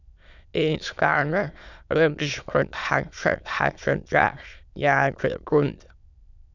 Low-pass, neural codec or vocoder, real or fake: 7.2 kHz; autoencoder, 22.05 kHz, a latent of 192 numbers a frame, VITS, trained on many speakers; fake